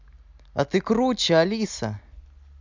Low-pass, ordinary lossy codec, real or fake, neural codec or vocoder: 7.2 kHz; none; real; none